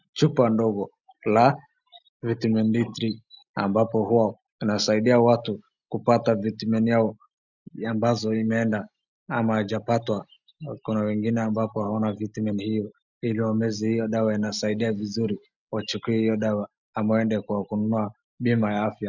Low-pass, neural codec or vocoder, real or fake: 7.2 kHz; none; real